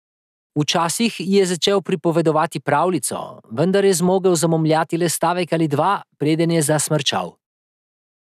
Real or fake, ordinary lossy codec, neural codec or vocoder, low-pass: real; none; none; 14.4 kHz